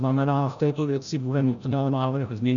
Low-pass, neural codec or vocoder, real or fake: 7.2 kHz; codec, 16 kHz, 0.5 kbps, FreqCodec, larger model; fake